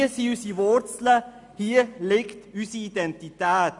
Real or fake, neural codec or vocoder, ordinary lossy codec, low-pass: real; none; none; 10.8 kHz